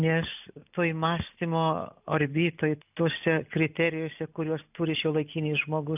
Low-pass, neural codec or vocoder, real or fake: 3.6 kHz; none; real